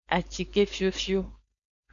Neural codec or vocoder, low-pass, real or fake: codec, 16 kHz, 4.8 kbps, FACodec; 7.2 kHz; fake